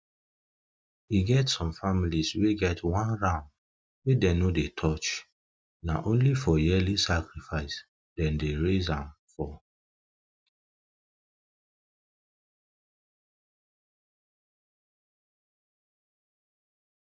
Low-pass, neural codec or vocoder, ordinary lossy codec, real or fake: none; none; none; real